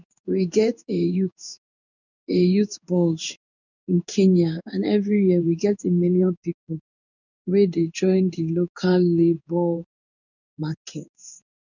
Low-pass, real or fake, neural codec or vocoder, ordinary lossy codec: 7.2 kHz; fake; codec, 16 kHz in and 24 kHz out, 1 kbps, XY-Tokenizer; none